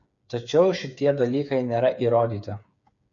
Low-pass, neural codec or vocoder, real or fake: 7.2 kHz; codec, 16 kHz, 8 kbps, FreqCodec, smaller model; fake